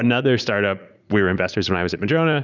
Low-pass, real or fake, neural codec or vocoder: 7.2 kHz; fake; vocoder, 44.1 kHz, 80 mel bands, Vocos